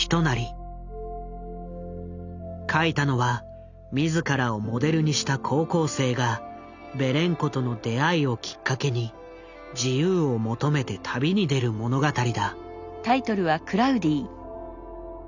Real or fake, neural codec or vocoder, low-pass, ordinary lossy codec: real; none; 7.2 kHz; none